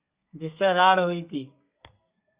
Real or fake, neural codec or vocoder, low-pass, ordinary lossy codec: fake; codec, 32 kHz, 1.9 kbps, SNAC; 3.6 kHz; Opus, 64 kbps